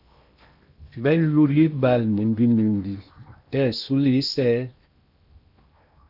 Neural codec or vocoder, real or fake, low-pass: codec, 16 kHz in and 24 kHz out, 0.8 kbps, FocalCodec, streaming, 65536 codes; fake; 5.4 kHz